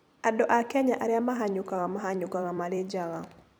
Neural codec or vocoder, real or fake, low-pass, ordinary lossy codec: vocoder, 44.1 kHz, 128 mel bands every 256 samples, BigVGAN v2; fake; none; none